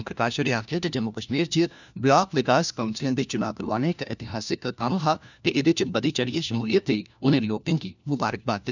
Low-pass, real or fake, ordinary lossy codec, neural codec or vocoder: 7.2 kHz; fake; none; codec, 16 kHz, 1 kbps, FunCodec, trained on LibriTTS, 50 frames a second